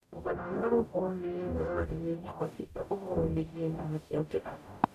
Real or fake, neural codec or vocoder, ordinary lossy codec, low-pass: fake; codec, 44.1 kHz, 0.9 kbps, DAC; none; 14.4 kHz